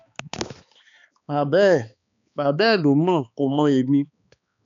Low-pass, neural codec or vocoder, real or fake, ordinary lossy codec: 7.2 kHz; codec, 16 kHz, 2 kbps, X-Codec, HuBERT features, trained on balanced general audio; fake; MP3, 64 kbps